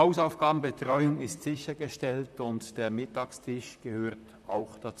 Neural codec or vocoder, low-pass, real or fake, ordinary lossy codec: vocoder, 44.1 kHz, 128 mel bands, Pupu-Vocoder; 14.4 kHz; fake; none